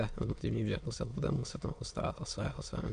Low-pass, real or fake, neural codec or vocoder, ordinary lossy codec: 9.9 kHz; fake; autoencoder, 22.05 kHz, a latent of 192 numbers a frame, VITS, trained on many speakers; MP3, 64 kbps